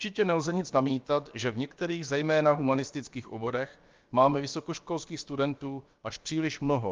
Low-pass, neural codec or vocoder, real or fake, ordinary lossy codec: 7.2 kHz; codec, 16 kHz, about 1 kbps, DyCAST, with the encoder's durations; fake; Opus, 32 kbps